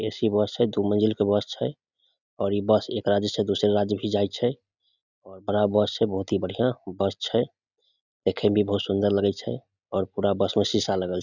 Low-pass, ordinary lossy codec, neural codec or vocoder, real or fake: 7.2 kHz; none; none; real